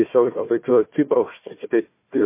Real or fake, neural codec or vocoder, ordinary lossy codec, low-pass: fake; codec, 16 kHz, 1 kbps, FunCodec, trained on Chinese and English, 50 frames a second; MP3, 24 kbps; 3.6 kHz